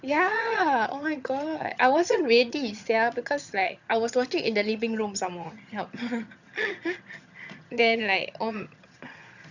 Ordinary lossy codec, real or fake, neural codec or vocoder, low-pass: none; fake; vocoder, 22.05 kHz, 80 mel bands, HiFi-GAN; 7.2 kHz